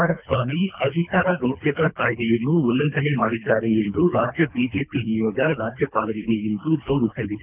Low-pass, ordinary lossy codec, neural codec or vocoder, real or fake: 3.6 kHz; none; codec, 24 kHz, 3 kbps, HILCodec; fake